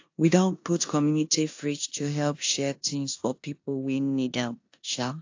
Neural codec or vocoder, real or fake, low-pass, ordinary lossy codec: codec, 16 kHz in and 24 kHz out, 0.9 kbps, LongCat-Audio-Codec, four codebook decoder; fake; 7.2 kHz; AAC, 48 kbps